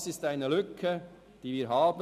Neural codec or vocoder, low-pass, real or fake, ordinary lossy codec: none; 14.4 kHz; real; none